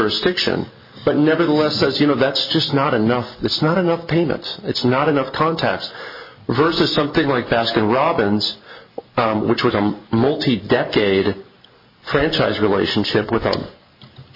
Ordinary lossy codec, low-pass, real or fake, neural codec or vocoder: MP3, 24 kbps; 5.4 kHz; real; none